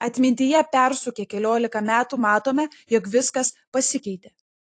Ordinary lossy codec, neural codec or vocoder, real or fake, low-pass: AAC, 48 kbps; vocoder, 44.1 kHz, 128 mel bands every 256 samples, BigVGAN v2; fake; 9.9 kHz